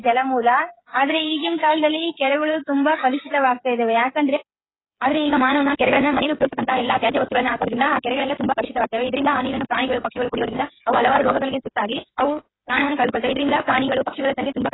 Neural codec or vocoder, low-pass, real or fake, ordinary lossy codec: codec, 16 kHz, 8 kbps, FreqCodec, smaller model; 7.2 kHz; fake; AAC, 16 kbps